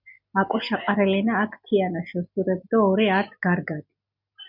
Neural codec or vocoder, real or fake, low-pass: none; real; 5.4 kHz